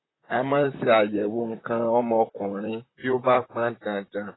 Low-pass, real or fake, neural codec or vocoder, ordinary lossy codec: 7.2 kHz; fake; vocoder, 44.1 kHz, 128 mel bands, Pupu-Vocoder; AAC, 16 kbps